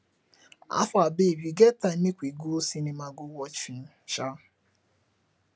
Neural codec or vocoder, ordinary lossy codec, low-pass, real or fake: none; none; none; real